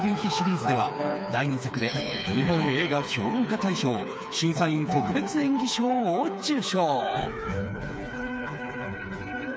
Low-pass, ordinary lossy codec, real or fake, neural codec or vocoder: none; none; fake; codec, 16 kHz, 4 kbps, FreqCodec, smaller model